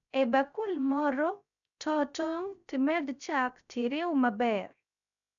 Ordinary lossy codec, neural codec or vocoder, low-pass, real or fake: none; codec, 16 kHz, 0.3 kbps, FocalCodec; 7.2 kHz; fake